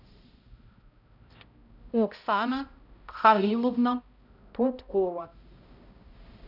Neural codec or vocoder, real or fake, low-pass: codec, 16 kHz, 0.5 kbps, X-Codec, HuBERT features, trained on balanced general audio; fake; 5.4 kHz